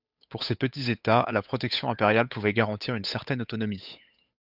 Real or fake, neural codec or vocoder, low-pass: fake; codec, 16 kHz, 8 kbps, FunCodec, trained on Chinese and English, 25 frames a second; 5.4 kHz